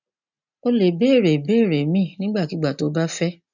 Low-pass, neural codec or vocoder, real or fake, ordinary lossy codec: 7.2 kHz; none; real; none